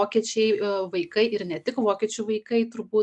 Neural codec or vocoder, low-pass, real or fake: none; 10.8 kHz; real